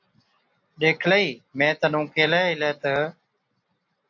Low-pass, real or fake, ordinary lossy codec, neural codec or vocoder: 7.2 kHz; real; AAC, 48 kbps; none